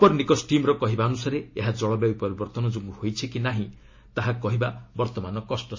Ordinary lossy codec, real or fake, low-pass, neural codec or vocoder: MP3, 32 kbps; real; 7.2 kHz; none